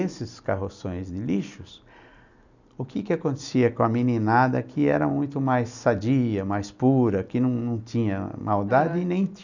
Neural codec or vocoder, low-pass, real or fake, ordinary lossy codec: none; 7.2 kHz; real; none